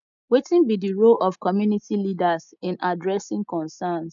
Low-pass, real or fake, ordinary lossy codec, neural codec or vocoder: 7.2 kHz; real; none; none